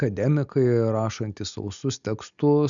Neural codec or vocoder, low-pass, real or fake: none; 7.2 kHz; real